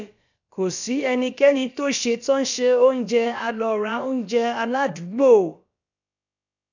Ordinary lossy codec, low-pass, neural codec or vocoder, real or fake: none; 7.2 kHz; codec, 16 kHz, about 1 kbps, DyCAST, with the encoder's durations; fake